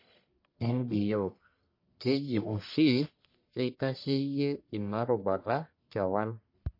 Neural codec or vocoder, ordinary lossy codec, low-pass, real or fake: codec, 44.1 kHz, 1.7 kbps, Pupu-Codec; MP3, 32 kbps; 5.4 kHz; fake